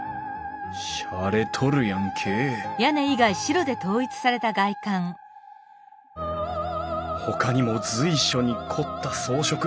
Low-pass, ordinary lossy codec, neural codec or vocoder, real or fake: none; none; none; real